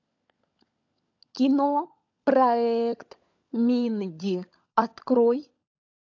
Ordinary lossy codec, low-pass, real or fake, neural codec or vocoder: AAC, 48 kbps; 7.2 kHz; fake; codec, 16 kHz, 16 kbps, FunCodec, trained on LibriTTS, 50 frames a second